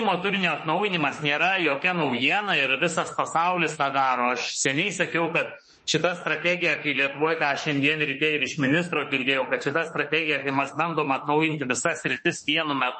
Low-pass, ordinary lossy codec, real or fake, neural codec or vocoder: 10.8 kHz; MP3, 32 kbps; fake; autoencoder, 48 kHz, 32 numbers a frame, DAC-VAE, trained on Japanese speech